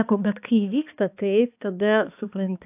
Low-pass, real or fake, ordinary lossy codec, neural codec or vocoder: 3.6 kHz; fake; AAC, 32 kbps; codec, 16 kHz, 2 kbps, X-Codec, HuBERT features, trained on balanced general audio